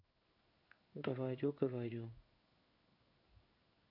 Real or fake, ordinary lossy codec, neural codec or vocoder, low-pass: fake; none; codec, 16 kHz in and 24 kHz out, 1 kbps, XY-Tokenizer; 5.4 kHz